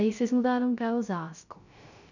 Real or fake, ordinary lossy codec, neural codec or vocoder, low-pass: fake; none; codec, 16 kHz, 0.3 kbps, FocalCodec; 7.2 kHz